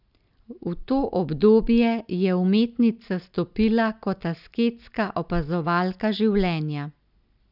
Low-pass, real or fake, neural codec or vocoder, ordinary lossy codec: 5.4 kHz; real; none; none